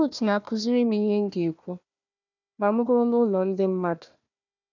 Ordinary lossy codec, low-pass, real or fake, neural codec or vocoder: none; 7.2 kHz; fake; codec, 16 kHz, 1 kbps, FunCodec, trained on Chinese and English, 50 frames a second